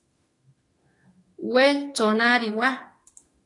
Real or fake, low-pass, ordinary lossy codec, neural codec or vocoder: fake; 10.8 kHz; AAC, 32 kbps; autoencoder, 48 kHz, 32 numbers a frame, DAC-VAE, trained on Japanese speech